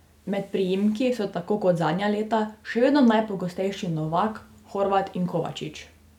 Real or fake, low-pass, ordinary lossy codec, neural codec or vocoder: real; 19.8 kHz; none; none